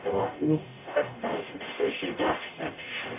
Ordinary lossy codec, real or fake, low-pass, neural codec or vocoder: MP3, 32 kbps; fake; 3.6 kHz; codec, 44.1 kHz, 0.9 kbps, DAC